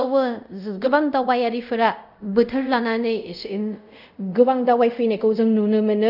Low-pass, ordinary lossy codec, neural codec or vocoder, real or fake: 5.4 kHz; none; codec, 24 kHz, 0.5 kbps, DualCodec; fake